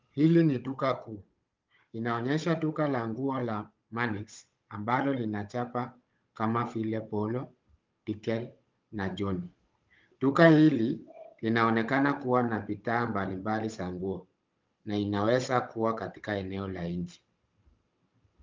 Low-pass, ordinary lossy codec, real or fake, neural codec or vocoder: 7.2 kHz; Opus, 32 kbps; fake; codec, 16 kHz, 16 kbps, FunCodec, trained on Chinese and English, 50 frames a second